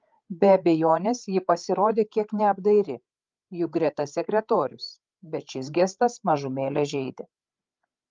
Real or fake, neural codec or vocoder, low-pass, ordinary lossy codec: fake; codec, 16 kHz, 8 kbps, FreqCodec, larger model; 7.2 kHz; Opus, 32 kbps